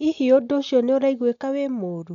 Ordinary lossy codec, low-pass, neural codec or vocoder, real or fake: MP3, 64 kbps; 7.2 kHz; none; real